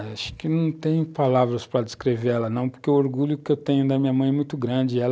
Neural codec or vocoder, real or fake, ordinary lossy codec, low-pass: none; real; none; none